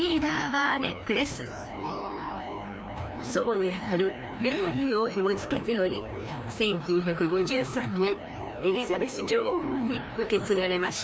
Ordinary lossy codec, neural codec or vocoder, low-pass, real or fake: none; codec, 16 kHz, 1 kbps, FreqCodec, larger model; none; fake